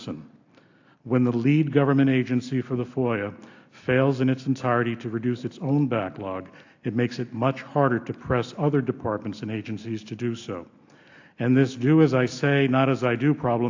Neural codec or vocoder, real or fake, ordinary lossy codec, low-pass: none; real; AAC, 48 kbps; 7.2 kHz